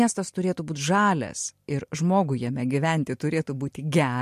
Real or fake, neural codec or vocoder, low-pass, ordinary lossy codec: real; none; 14.4 kHz; MP3, 64 kbps